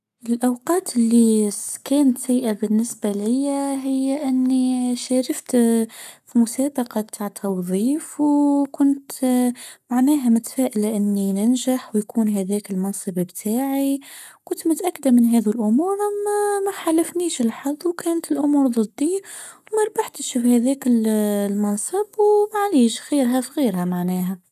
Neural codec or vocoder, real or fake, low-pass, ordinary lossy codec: codec, 44.1 kHz, 7.8 kbps, Pupu-Codec; fake; 14.4 kHz; none